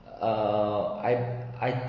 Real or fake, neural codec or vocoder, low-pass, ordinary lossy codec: real; none; 7.2 kHz; MP3, 32 kbps